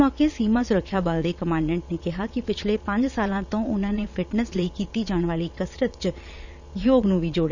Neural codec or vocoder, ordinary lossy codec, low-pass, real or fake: vocoder, 22.05 kHz, 80 mel bands, Vocos; none; 7.2 kHz; fake